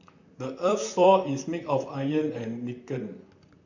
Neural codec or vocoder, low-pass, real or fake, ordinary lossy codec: vocoder, 44.1 kHz, 128 mel bands, Pupu-Vocoder; 7.2 kHz; fake; none